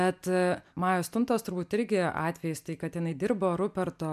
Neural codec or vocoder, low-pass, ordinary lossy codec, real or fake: none; 14.4 kHz; MP3, 96 kbps; real